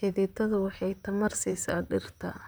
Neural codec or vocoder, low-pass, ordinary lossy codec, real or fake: vocoder, 44.1 kHz, 128 mel bands, Pupu-Vocoder; none; none; fake